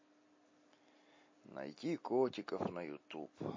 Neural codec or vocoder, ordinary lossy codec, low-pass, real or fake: none; MP3, 32 kbps; 7.2 kHz; real